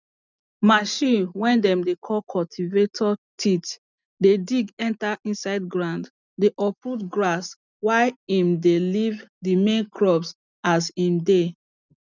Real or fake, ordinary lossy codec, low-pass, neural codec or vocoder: real; none; 7.2 kHz; none